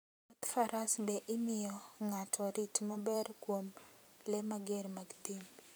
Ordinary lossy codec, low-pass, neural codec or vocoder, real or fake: none; none; vocoder, 44.1 kHz, 128 mel bands every 512 samples, BigVGAN v2; fake